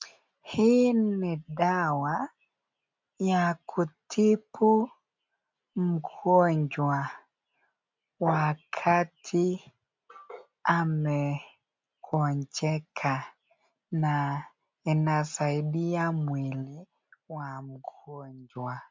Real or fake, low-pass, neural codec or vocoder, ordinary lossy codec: real; 7.2 kHz; none; MP3, 64 kbps